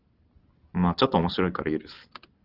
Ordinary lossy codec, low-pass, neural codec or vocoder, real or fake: Opus, 16 kbps; 5.4 kHz; none; real